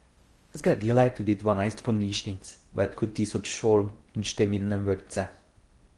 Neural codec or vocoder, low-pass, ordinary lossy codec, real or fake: codec, 16 kHz in and 24 kHz out, 0.8 kbps, FocalCodec, streaming, 65536 codes; 10.8 kHz; Opus, 24 kbps; fake